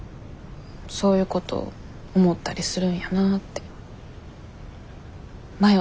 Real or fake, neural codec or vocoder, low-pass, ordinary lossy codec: real; none; none; none